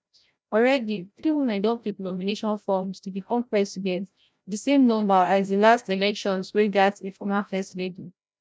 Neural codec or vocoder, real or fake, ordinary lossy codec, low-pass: codec, 16 kHz, 0.5 kbps, FreqCodec, larger model; fake; none; none